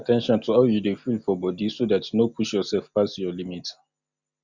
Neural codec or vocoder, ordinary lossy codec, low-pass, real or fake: none; Opus, 64 kbps; 7.2 kHz; real